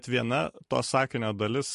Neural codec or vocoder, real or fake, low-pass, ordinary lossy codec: vocoder, 44.1 kHz, 128 mel bands every 256 samples, BigVGAN v2; fake; 14.4 kHz; MP3, 48 kbps